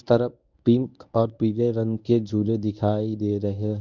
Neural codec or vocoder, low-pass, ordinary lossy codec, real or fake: codec, 24 kHz, 0.9 kbps, WavTokenizer, medium speech release version 1; 7.2 kHz; none; fake